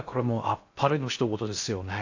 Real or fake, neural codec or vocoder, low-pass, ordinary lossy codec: fake; codec, 16 kHz in and 24 kHz out, 0.6 kbps, FocalCodec, streaming, 2048 codes; 7.2 kHz; AAC, 48 kbps